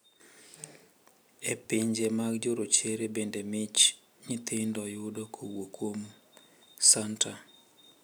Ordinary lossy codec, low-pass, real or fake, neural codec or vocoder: none; none; real; none